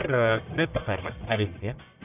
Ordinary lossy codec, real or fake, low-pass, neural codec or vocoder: none; fake; 3.6 kHz; codec, 44.1 kHz, 1.7 kbps, Pupu-Codec